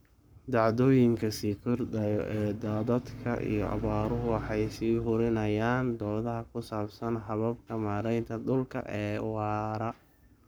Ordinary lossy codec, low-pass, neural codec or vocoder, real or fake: none; none; codec, 44.1 kHz, 7.8 kbps, Pupu-Codec; fake